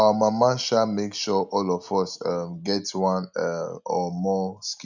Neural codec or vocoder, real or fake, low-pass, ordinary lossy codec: none; real; 7.2 kHz; none